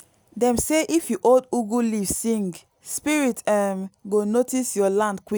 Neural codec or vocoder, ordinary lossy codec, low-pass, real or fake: none; none; none; real